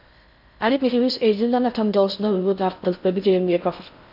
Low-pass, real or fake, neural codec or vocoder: 5.4 kHz; fake; codec, 16 kHz in and 24 kHz out, 0.6 kbps, FocalCodec, streaming, 4096 codes